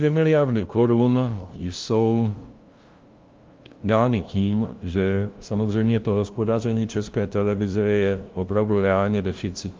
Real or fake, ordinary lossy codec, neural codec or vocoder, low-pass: fake; Opus, 32 kbps; codec, 16 kHz, 0.5 kbps, FunCodec, trained on LibriTTS, 25 frames a second; 7.2 kHz